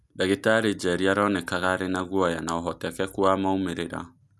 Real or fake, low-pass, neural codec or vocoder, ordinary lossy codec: real; none; none; none